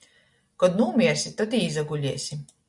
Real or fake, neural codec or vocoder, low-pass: real; none; 10.8 kHz